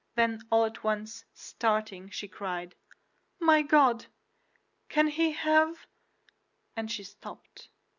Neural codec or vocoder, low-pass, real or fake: none; 7.2 kHz; real